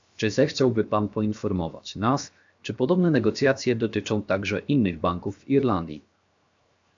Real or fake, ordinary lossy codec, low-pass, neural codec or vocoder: fake; AAC, 64 kbps; 7.2 kHz; codec, 16 kHz, 0.7 kbps, FocalCodec